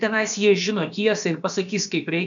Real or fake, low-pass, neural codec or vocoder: fake; 7.2 kHz; codec, 16 kHz, about 1 kbps, DyCAST, with the encoder's durations